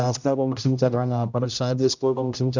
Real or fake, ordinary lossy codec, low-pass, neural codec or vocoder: fake; none; 7.2 kHz; codec, 16 kHz, 0.5 kbps, X-Codec, HuBERT features, trained on general audio